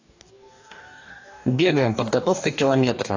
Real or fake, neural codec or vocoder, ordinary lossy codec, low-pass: fake; codec, 44.1 kHz, 2.6 kbps, DAC; AAC, 48 kbps; 7.2 kHz